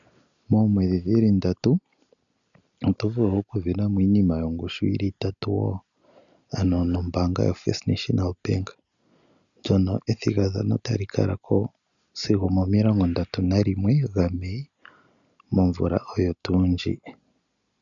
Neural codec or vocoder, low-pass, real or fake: none; 7.2 kHz; real